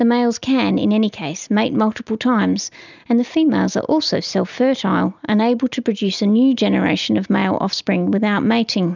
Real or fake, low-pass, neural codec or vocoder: real; 7.2 kHz; none